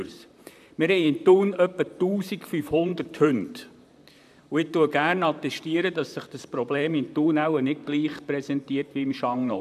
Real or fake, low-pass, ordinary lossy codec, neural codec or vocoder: fake; 14.4 kHz; none; vocoder, 44.1 kHz, 128 mel bands, Pupu-Vocoder